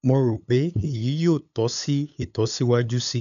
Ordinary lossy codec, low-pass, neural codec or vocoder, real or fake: none; 7.2 kHz; codec, 16 kHz, 8 kbps, FunCodec, trained on LibriTTS, 25 frames a second; fake